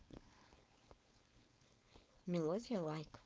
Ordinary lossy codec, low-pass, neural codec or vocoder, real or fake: none; none; codec, 16 kHz, 4.8 kbps, FACodec; fake